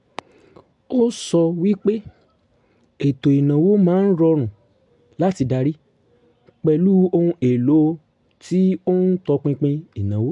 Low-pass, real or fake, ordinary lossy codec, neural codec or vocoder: 10.8 kHz; real; MP3, 64 kbps; none